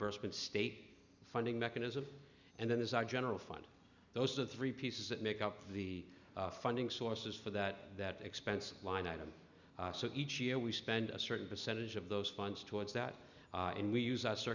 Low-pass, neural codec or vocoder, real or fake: 7.2 kHz; none; real